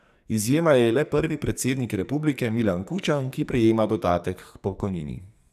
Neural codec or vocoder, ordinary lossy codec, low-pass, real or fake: codec, 44.1 kHz, 2.6 kbps, SNAC; none; 14.4 kHz; fake